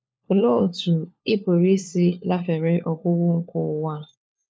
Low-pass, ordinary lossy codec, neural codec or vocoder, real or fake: none; none; codec, 16 kHz, 4 kbps, FunCodec, trained on LibriTTS, 50 frames a second; fake